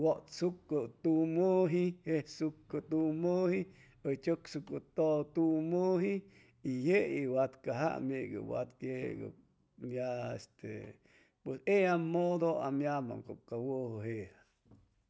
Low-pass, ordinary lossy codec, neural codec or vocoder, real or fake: none; none; none; real